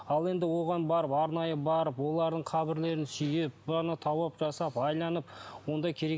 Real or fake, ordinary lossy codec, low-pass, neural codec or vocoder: real; none; none; none